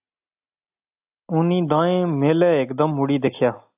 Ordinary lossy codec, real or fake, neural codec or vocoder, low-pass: AAC, 32 kbps; real; none; 3.6 kHz